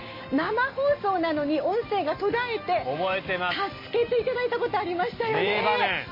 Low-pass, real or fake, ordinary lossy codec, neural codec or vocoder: 5.4 kHz; real; MP3, 24 kbps; none